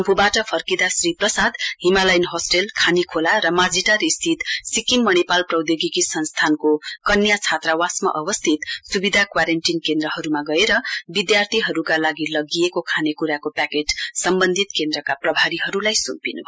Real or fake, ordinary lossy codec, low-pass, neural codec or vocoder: real; none; none; none